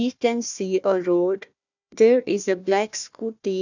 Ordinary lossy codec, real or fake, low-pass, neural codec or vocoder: none; fake; 7.2 kHz; codec, 16 kHz, 1 kbps, FunCodec, trained on Chinese and English, 50 frames a second